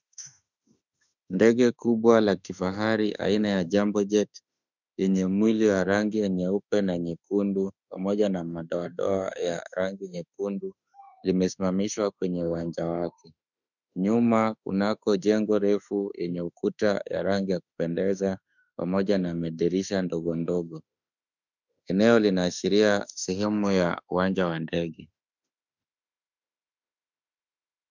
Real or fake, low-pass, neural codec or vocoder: fake; 7.2 kHz; autoencoder, 48 kHz, 32 numbers a frame, DAC-VAE, trained on Japanese speech